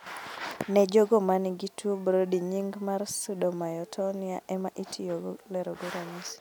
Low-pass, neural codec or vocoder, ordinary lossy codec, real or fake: none; none; none; real